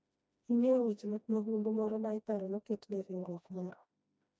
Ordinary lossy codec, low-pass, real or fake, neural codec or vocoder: none; none; fake; codec, 16 kHz, 1 kbps, FreqCodec, smaller model